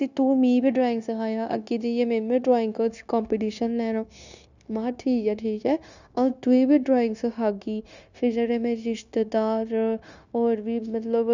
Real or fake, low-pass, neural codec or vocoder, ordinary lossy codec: fake; 7.2 kHz; codec, 16 kHz, 0.9 kbps, LongCat-Audio-Codec; none